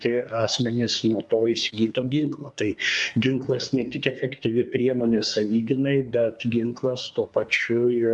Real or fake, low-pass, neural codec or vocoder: fake; 10.8 kHz; codec, 24 kHz, 1 kbps, SNAC